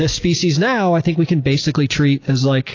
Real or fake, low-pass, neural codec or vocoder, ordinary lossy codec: real; 7.2 kHz; none; AAC, 32 kbps